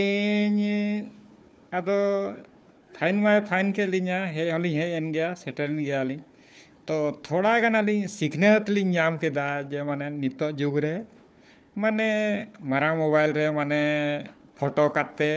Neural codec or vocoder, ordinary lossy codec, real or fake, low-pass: codec, 16 kHz, 4 kbps, FunCodec, trained on Chinese and English, 50 frames a second; none; fake; none